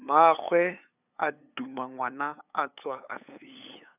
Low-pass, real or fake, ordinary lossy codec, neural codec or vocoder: 3.6 kHz; fake; none; codec, 16 kHz, 8 kbps, FunCodec, trained on LibriTTS, 25 frames a second